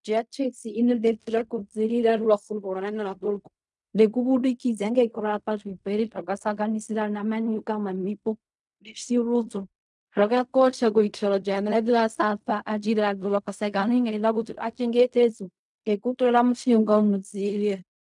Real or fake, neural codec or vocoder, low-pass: fake; codec, 16 kHz in and 24 kHz out, 0.4 kbps, LongCat-Audio-Codec, fine tuned four codebook decoder; 10.8 kHz